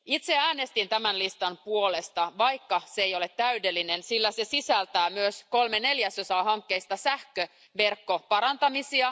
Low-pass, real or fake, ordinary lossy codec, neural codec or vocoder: none; real; none; none